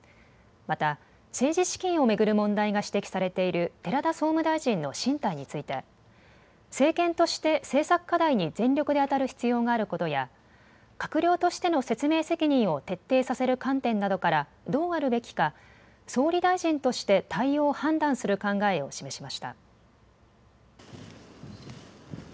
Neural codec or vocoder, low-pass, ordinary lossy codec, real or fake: none; none; none; real